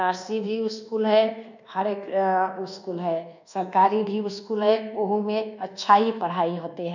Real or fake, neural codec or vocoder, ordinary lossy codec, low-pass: fake; codec, 24 kHz, 1.2 kbps, DualCodec; none; 7.2 kHz